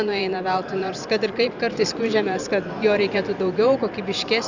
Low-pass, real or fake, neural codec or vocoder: 7.2 kHz; fake; vocoder, 44.1 kHz, 128 mel bands every 512 samples, BigVGAN v2